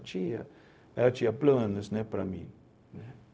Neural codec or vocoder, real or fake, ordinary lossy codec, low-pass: codec, 16 kHz, 0.4 kbps, LongCat-Audio-Codec; fake; none; none